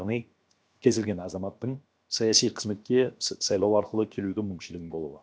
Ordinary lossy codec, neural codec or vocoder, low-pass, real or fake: none; codec, 16 kHz, 0.7 kbps, FocalCodec; none; fake